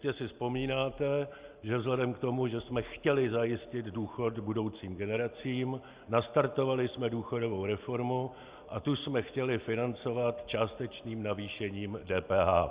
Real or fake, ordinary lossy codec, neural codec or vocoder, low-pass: real; Opus, 64 kbps; none; 3.6 kHz